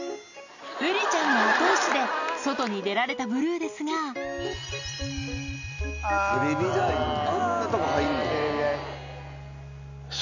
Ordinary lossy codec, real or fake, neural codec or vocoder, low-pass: none; real; none; 7.2 kHz